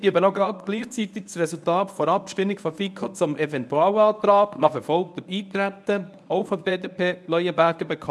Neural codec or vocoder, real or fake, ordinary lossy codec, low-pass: codec, 24 kHz, 0.9 kbps, WavTokenizer, medium speech release version 1; fake; none; none